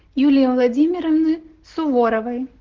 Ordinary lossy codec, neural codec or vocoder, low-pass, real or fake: Opus, 16 kbps; none; 7.2 kHz; real